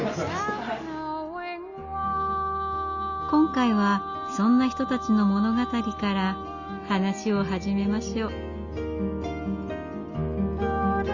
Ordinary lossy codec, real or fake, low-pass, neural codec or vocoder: Opus, 64 kbps; real; 7.2 kHz; none